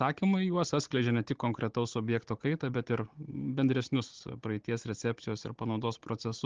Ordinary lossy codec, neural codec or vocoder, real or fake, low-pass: Opus, 16 kbps; codec, 16 kHz, 16 kbps, FunCodec, trained on Chinese and English, 50 frames a second; fake; 7.2 kHz